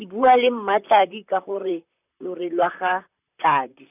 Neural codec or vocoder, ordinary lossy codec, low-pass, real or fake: vocoder, 44.1 kHz, 128 mel bands, Pupu-Vocoder; none; 3.6 kHz; fake